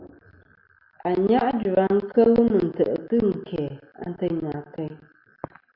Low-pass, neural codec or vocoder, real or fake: 5.4 kHz; none; real